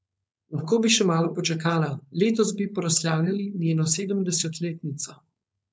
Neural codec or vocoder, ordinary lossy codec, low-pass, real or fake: codec, 16 kHz, 4.8 kbps, FACodec; none; none; fake